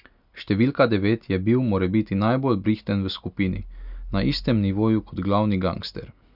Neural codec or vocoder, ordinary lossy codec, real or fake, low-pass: none; none; real; 5.4 kHz